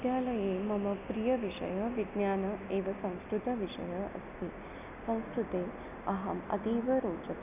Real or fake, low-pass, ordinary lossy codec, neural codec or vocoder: real; 3.6 kHz; none; none